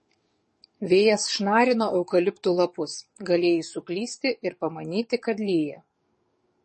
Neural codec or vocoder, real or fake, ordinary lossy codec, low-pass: codec, 44.1 kHz, 7.8 kbps, DAC; fake; MP3, 32 kbps; 10.8 kHz